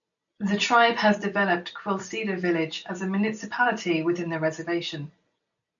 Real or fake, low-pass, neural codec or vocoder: real; 7.2 kHz; none